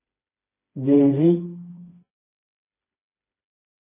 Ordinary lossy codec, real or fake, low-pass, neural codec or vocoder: MP3, 16 kbps; fake; 3.6 kHz; codec, 16 kHz, 2 kbps, FreqCodec, smaller model